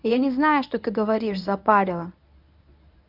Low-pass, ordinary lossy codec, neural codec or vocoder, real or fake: 5.4 kHz; none; codec, 24 kHz, 0.9 kbps, WavTokenizer, medium speech release version 1; fake